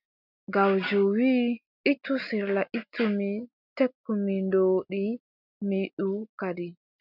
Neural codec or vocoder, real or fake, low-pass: none; real; 5.4 kHz